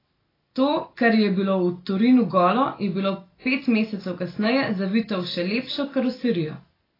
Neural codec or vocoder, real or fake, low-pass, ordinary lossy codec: none; real; 5.4 kHz; AAC, 24 kbps